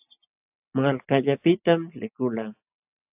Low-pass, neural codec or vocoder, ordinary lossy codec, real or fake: 3.6 kHz; none; AAC, 32 kbps; real